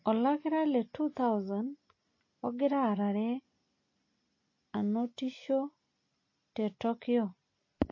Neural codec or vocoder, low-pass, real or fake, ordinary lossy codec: none; 7.2 kHz; real; MP3, 32 kbps